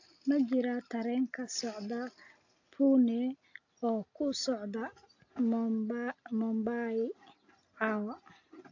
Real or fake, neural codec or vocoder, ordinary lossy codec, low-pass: real; none; AAC, 48 kbps; 7.2 kHz